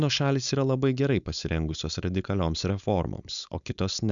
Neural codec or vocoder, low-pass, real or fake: codec, 16 kHz, 4.8 kbps, FACodec; 7.2 kHz; fake